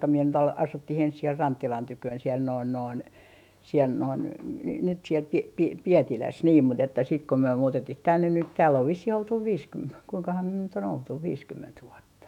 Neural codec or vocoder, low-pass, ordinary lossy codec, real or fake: autoencoder, 48 kHz, 128 numbers a frame, DAC-VAE, trained on Japanese speech; 19.8 kHz; none; fake